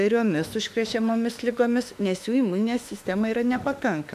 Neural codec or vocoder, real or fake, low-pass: autoencoder, 48 kHz, 32 numbers a frame, DAC-VAE, trained on Japanese speech; fake; 14.4 kHz